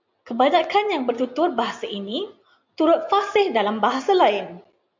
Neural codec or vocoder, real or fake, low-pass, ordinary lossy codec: none; real; 7.2 kHz; MP3, 64 kbps